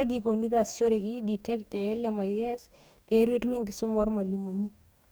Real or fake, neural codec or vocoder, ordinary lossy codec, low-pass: fake; codec, 44.1 kHz, 2.6 kbps, DAC; none; none